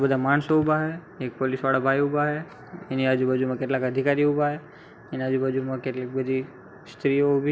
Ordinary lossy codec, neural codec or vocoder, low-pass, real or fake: none; none; none; real